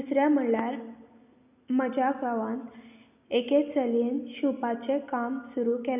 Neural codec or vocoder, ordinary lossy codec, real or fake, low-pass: none; none; real; 3.6 kHz